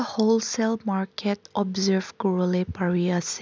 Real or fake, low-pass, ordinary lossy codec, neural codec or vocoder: real; none; none; none